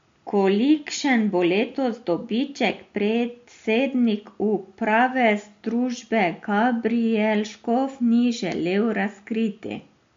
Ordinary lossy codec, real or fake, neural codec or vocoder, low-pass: MP3, 48 kbps; real; none; 7.2 kHz